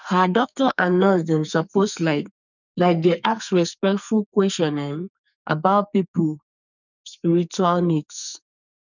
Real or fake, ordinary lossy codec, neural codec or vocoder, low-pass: fake; none; codec, 44.1 kHz, 2.6 kbps, SNAC; 7.2 kHz